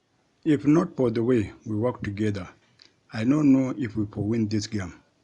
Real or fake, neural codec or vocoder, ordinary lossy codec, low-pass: real; none; Opus, 64 kbps; 10.8 kHz